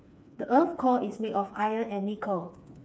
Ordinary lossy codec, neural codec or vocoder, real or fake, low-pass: none; codec, 16 kHz, 4 kbps, FreqCodec, smaller model; fake; none